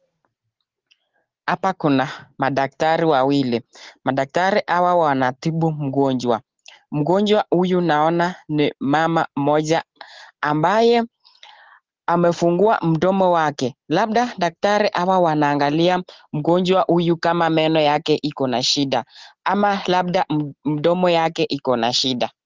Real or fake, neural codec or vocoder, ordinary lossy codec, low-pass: real; none; Opus, 16 kbps; 7.2 kHz